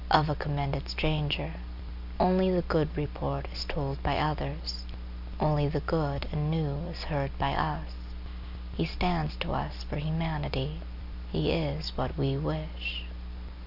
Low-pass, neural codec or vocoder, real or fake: 5.4 kHz; none; real